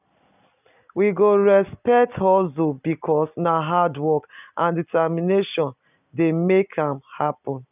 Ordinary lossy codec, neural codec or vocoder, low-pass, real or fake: none; none; 3.6 kHz; real